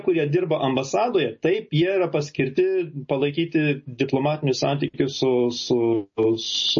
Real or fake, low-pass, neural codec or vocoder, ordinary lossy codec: real; 7.2 kHz; none; MP3, 32 kbps